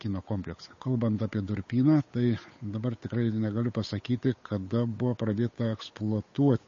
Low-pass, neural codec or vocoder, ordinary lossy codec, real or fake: 7.2 kHz; codec, 16 kHz, 8 kbps, FunCodec, trained on Chinese and English, 25 frames a second; MP3, 32 kbps; fake